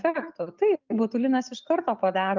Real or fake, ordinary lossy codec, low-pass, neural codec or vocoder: real; Opus, 24 kbps; 7.2 kHz; none